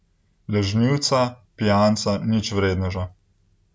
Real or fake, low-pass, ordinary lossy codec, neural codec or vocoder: real; none; none; none